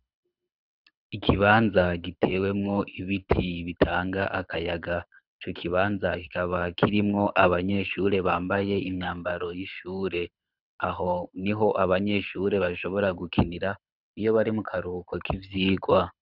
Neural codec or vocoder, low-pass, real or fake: codec, 24 kHz, 6 kbps, HILCodec; 5.4 kHz; fake